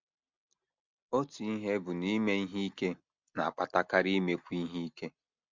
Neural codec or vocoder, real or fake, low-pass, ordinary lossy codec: none; real; 7.2 kHz; MP3, 64 kbps